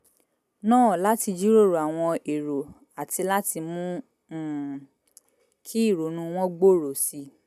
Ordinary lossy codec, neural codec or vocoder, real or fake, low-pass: none; none; real; 14.4 kHz